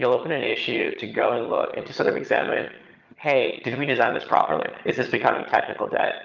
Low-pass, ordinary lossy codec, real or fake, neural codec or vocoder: 7.2 kHz; Opus, 24 kbps; fake; vocoder, 22.05 kHz, 80 mel bands, HiFi-GAN